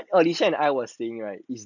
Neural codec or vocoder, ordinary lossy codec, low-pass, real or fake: none; none; 7.2 kHz; real